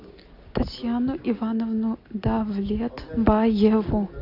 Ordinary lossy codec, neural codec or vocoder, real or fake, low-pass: AAC, 32 kbps; none; real; 5.4 kHz